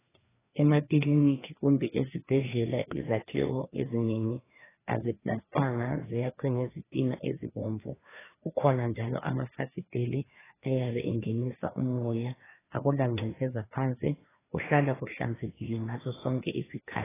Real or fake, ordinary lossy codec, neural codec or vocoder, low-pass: fake; AAC, 16 kbps; codec, 16 kHz, 2 kbps, FreqCodec, larger model; 3.6 kHz